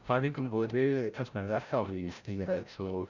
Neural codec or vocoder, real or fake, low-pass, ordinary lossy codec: codec, 16 kHz, 0.5 kbps, FreqCodec, larger model; fake; 7.2 kHz; none